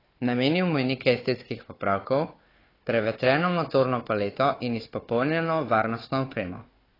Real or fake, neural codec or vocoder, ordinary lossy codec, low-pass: fake; codec, 16 kHz, 4 kbps, FunCodec, trained on Chinese and English, 50 frames a second; AAC, 24 kbps; 5.4 kHz